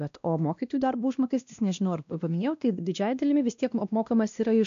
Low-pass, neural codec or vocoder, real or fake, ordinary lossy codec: 7.2 kHz; codec, 16 kHz, 1 kbps, X-Codec, WavLM features, trained on Multilingual LibriSpeech; fake; AAC, 64 kbps